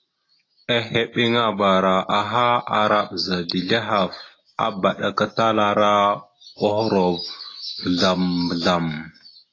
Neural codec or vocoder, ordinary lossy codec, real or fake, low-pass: none; AAC, 32 kbps; real; 7.2 kHz